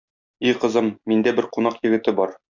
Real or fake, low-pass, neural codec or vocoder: real; 7.2 kHz; none